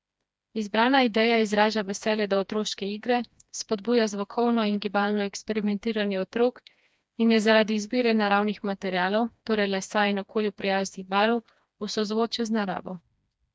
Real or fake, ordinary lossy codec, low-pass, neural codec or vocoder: fake; none; none; codec, 16 kHz, 2 kbps, FreqCodec, smaller model